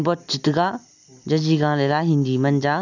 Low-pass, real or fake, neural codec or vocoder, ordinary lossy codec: 7.2 kHz; real; none; none